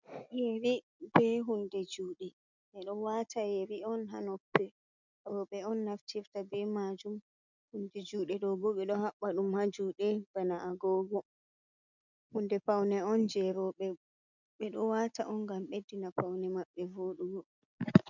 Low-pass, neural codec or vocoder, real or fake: 7.2 kHz; none; real